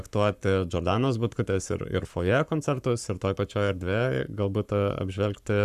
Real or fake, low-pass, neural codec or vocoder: fake; 14.4 kHz; codec, 44.1 kHz, 7.8 kbps, Pupu-Codec